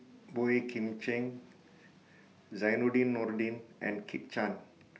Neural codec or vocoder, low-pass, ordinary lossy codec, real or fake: none; none; none; real